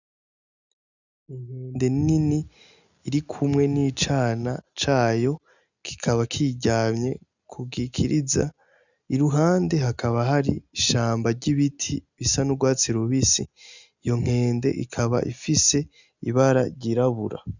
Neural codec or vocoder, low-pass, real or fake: none; 7.2 kHz; real